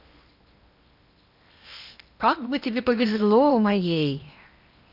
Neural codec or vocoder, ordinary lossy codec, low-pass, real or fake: codec, 16 kHz in and 24 kHz out, 0.8 kbps, FocalCodec, streaming, 65536 codes; none; 5.4 kHz; fake